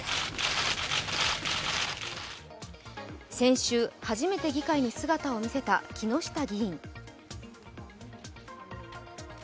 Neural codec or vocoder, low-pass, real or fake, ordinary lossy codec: none; none; real; none